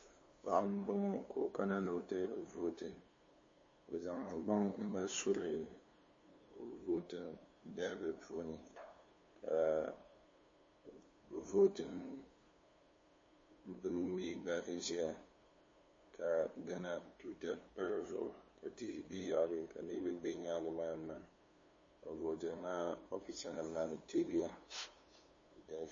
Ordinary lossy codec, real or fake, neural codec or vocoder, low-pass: MP3, 32 kbps; fake; codec, 16 kHz, 2 kbps, FunCodec, trained on LibriTTS, 25 frames a second; 7.2 kHz